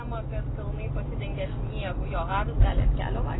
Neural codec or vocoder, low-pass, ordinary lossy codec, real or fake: none; 7.2 kHz; AAC, 16 kbps; real